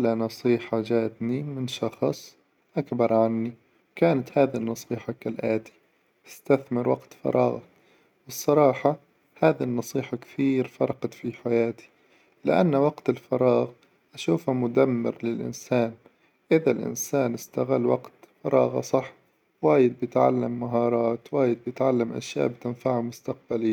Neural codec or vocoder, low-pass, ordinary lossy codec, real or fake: none; 19.8 kHz; none; real